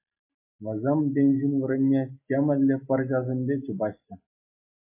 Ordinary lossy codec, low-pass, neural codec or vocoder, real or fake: MP3, 24 kbps; 3.6 kHz; none; real